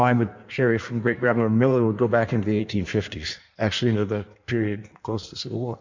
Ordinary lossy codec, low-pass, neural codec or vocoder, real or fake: MP3, 64 kbps; 7.2 kHz; codec, 16 kHz in and 24 kHz out, 1.1 kbps, FireRedTTS-2 codec; fake